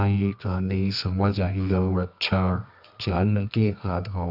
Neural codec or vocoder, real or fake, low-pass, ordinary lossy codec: codec, 24 kHz, 0.9 kbps, WavTokenizer, medium music audio release; fake; 5.4 kHz; none